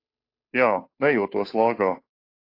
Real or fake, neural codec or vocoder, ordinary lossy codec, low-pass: fake; codec, 16 kHz, 2 kbps, FunCodec, trained on Chinese and English, 25 frames a second; AAC, 32 kbps; 5.4 kHz